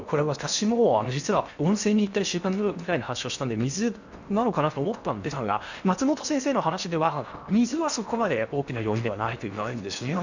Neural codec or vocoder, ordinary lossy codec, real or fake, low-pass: codec, 16 kHz in and 24 kHz out, 0.8 kbps, FocalCodec, streaming, 65536 codes; none; fake; 7.2 kHz